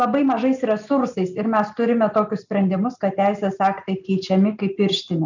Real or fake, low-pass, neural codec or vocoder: real; 7.2 kHz; none